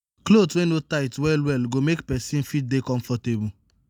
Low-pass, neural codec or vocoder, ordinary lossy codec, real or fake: none; none; none; real